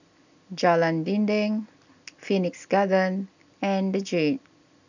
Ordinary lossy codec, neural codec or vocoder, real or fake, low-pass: none; none; real; 7.2 kHz